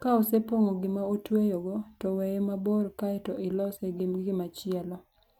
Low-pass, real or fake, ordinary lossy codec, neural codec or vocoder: 19.8 kHz; real; none; none